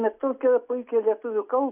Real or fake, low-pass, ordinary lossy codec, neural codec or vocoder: real; 3.6 kHz; AAC, 32 kbps; none